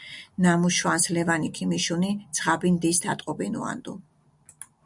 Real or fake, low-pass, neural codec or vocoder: real; 10.8 kHz; none